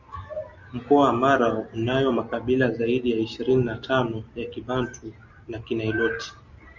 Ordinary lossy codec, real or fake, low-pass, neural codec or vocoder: Opus, 64 kbps; real; 7.2 kHz; none